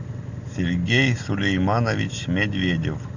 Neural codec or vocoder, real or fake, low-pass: none; real; 7.2 kHz